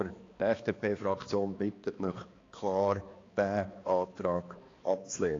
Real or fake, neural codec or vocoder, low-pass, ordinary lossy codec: fake; codec, 16 kHz, 2 kbps, X-Codec, HuBERT features, trained on balanced general audio; 7.2 kHz; AAC, 32 kbps